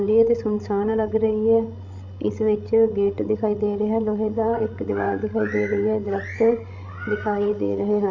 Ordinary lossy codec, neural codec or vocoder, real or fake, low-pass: MP3, 64 kbps; codec, 16 kHz, 16 kbps, FreqCodec, larger model; fake; 7.2 kHz